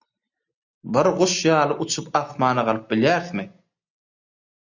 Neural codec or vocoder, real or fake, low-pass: none; real; 7.2 kHz